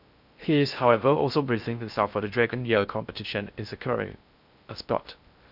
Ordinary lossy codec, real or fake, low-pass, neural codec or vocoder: none; fake; 5.4 kHz; codec, 16 kHz in and 24 kHz out, 0.6 kbps, FocalCodec, streaming, 4096 codes